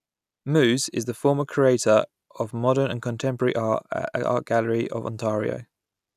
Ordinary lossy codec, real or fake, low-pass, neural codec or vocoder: none; real; 14.4 kHz; none